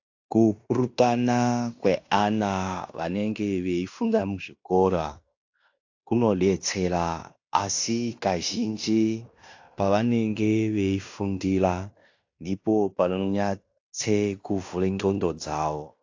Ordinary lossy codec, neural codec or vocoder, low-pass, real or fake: AAC, 48 kbps; codec, 16 kHz in and 24 kHz out, 0.9 kbps, LongCat-Audio-Codec, fine tuned four codebook decoder; 7.2 kHz; fake